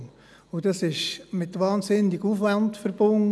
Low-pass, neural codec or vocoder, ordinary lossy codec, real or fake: none; none; none; real